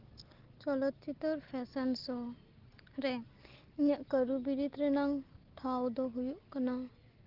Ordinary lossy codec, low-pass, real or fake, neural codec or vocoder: Opus, 16 kbps; 5.4 kHz; real; none